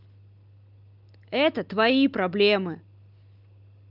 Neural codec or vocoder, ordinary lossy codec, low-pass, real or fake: none; Opus, 24 kbps; 5.4 kHz; real